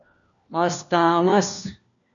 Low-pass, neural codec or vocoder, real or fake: 7.2 kHz; codec, 16 kHz, 1 kbps, FunCodec, trained on LibriTTS, 50 frames a second; fake